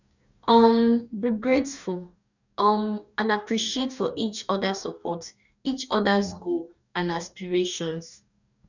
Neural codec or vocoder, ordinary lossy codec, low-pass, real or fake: codec, 44.1 kHz, 2.6 kbps, DAC; none; 7.2 kHz; fake